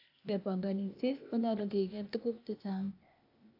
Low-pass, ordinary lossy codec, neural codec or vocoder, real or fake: 5.4 kHz; AAC, 32 kbps; codec, 16 kHz, 0.8 kbps, ZipCodec; fake